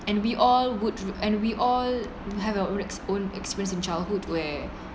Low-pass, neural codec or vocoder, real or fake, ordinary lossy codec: none; none; real; none